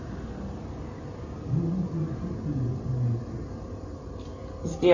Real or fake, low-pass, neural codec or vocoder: real; 7.2 kHz; none